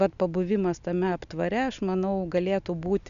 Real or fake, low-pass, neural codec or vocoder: real; 7.2 kHz; none